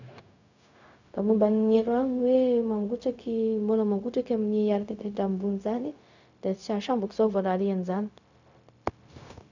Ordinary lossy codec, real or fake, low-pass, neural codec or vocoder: none; fake; 7.2 kHz; codec, 16 kHz, 0.4 kbps, LongCat-Audio-Codec